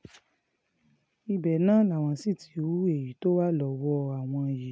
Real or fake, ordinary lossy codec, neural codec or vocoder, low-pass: real; none; none; none